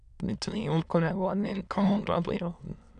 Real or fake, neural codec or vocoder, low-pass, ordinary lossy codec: fake; autoencoder, 22.05 kHz, a latent of 192 numbers a frame, VITS, trained on many speakers; 9.9 kHz; none